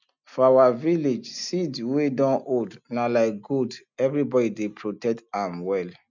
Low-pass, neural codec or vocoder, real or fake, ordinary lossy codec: 7.2 kHz; none; real; none